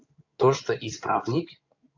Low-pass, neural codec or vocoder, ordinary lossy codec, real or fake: 7.2 kHz; codec, 16 kHz in and 24 kHz out, 2.2 kbps, FireRedTTS-2 codec; AAC, 48 kbps; fake